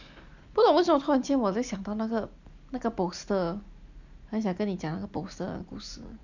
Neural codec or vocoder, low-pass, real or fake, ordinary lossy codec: none; 7.2 kHz; real; none